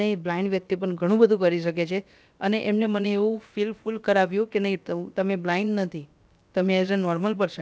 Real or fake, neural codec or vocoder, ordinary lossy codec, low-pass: fake; codec, 16 kHz, about 1 kbps, DyCAST, with the encoder's durations; none; none